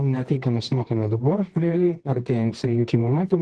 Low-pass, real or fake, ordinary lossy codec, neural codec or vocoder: 10.8 kHz; fake; Opus, 16 kbps; codec, 24 kHz, 0.9 kbps, WavTokenizer, medium music audio release